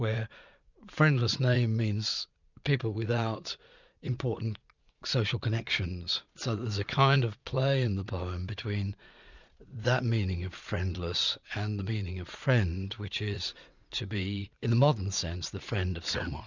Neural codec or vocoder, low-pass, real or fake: vocoder, 44.1 kHz, 80 mel bands, Vocos; 7.2 kHz; fake